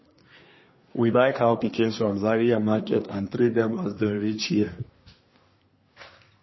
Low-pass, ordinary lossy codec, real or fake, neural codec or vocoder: 7.2 kHz; MP3, 24 kbps; fake; codec, 24 kHz, 1 kbps, SNAC